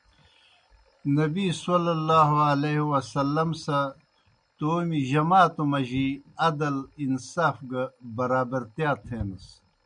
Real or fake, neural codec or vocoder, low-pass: real; none; 9.9 kHz